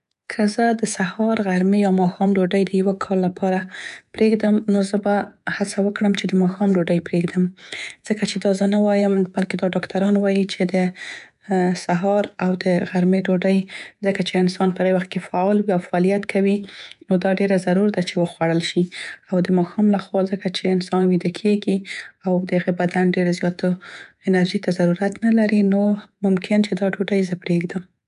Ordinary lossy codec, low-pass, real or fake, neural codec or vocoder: none; 10.8 kHz; fake; codec, 24 kHz, 3.1 kbps, DualCodec